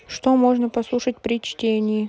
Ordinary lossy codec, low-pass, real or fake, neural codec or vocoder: none; none; real; none